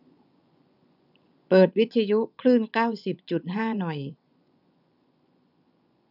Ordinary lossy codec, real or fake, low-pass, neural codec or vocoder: none; fake; 5.4 kHz; vocoder, 24 kHz, 100 mel bands, Vocos